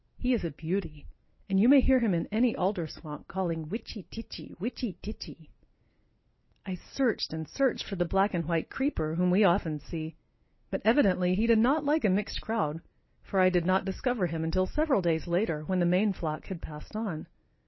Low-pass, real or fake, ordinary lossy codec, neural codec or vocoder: 7.2 kHz; real; MP3, 24 kbps; none